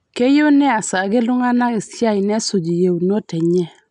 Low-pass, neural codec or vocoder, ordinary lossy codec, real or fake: 10.8 kHz; none; none; real